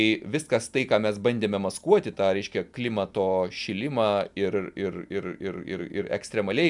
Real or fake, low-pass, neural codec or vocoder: real; 10.8 kHz; none